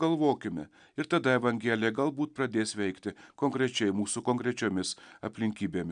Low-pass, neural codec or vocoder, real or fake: 9.9 kHz; none; real